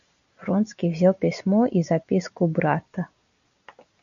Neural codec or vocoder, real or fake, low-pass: none; real; 7.2 kHz